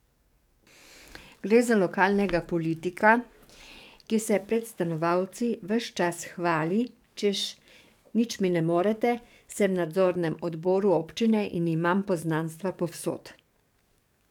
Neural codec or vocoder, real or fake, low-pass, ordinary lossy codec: codec, 44.1 kHz, 7.8 kbps, DAC; fake; 19.8 kHz; none